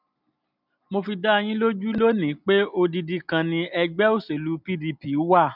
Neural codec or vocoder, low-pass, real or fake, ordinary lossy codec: none; 5.4 kHz; real; none